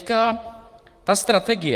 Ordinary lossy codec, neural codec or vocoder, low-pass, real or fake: Opus, 32 kbps; vocoder, 44.1 kHz, 128 mel bands, Pupu-Vocoder; 14.4 kHz; fake